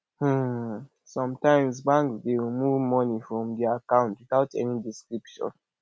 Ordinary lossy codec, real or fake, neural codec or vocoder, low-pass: none; real; none; none